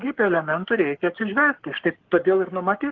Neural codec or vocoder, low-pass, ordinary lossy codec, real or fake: codec, 44.1 kHz, 7.8 kbps, Pupu-Codec; 7.2 kHz; Opus, 16 kbps; fake